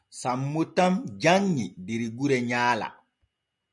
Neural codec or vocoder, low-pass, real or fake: none; 10.8 kHz; real